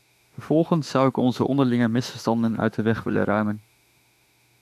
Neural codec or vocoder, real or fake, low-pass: autoencoder, 48 kHz, 32 numbers a frame, DAC-VAE, trained on Japanese speech; fake; 14.4 kHz